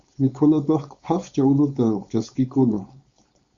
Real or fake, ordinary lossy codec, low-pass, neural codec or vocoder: fake; Opus, 64 kbps; 7.2 kHz; codec, 16 kHz, 4.8 kbps, FACodec